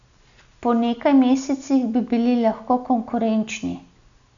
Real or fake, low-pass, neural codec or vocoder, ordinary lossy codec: real; 7.2 kHz; none; none